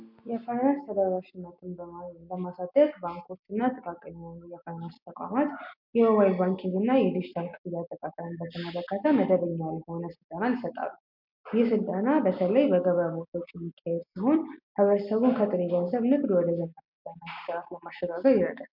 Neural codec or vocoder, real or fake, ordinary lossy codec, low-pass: none; real; MP3, 48 kbps; 5.4 kHz